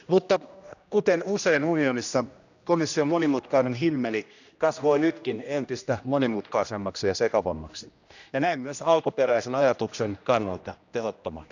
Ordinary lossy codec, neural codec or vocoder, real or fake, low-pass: none; codec, 16 kHz, 1 kbps, X-Codec, HuBERT features, trained on general audio; fake; 7.2 kHz